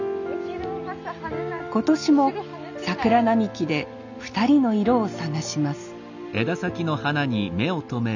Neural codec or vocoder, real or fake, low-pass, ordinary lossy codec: none; real; 7.2 kHz; none